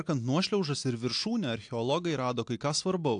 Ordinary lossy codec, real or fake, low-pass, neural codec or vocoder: AAC, 64 kbps; real; 9.9 kHz; none